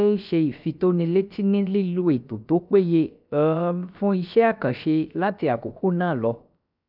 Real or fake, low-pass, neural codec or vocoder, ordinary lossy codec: fake; 5.4 kHz; codec, 16 kHz, about 1 kbps, DyCAST, with the encoder's durations; none